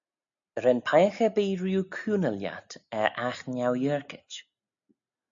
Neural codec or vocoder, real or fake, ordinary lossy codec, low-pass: none; real; MP3, 64 kbps; 7.2 kHz